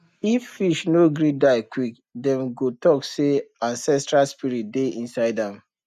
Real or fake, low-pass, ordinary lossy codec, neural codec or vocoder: real; 14.4 kHz; none; none